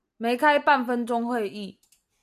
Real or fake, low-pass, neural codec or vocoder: real; 14.4 kHz; none